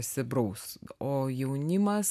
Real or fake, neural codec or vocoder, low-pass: real; none; 14.4 kHz